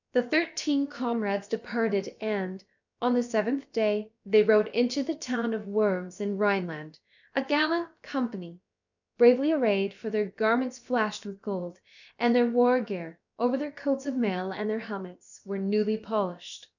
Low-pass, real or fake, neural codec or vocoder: 7.2 kHz; fake; codec, 16 kHz, about 1 kbps, DyCAST, with the encoder's durations